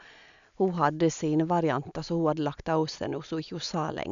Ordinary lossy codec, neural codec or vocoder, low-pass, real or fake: none; none; 7.2 kHz; real